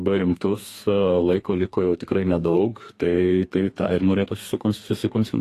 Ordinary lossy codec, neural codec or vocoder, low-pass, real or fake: AAC, 48 kbps; codec, 32 kHz, 1.9 kbps, SNAC; 14.4 kHz; fake